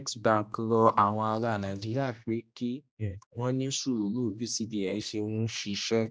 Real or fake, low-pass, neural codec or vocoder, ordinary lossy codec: fake; none; codec, 16 kHz, 1 kbps, X-Codec, HuBERT features, trained on general audio; none